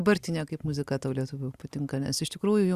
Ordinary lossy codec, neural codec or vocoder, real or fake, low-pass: Opus, 64 kbps; none; real; 14.4 kHz